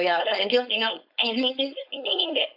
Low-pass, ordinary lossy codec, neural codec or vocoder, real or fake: 5.4 kHz; none; codec, 16 kHz, 4.8 kbps, FACodec; fake